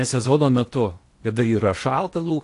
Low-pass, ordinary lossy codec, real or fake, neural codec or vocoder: 10.8 kHz; AAC, 48 kbps; fake; codec, 16 kHz in and 24 kHz out, 0.8 kbps, FocalCodec, streaming, 65536 codes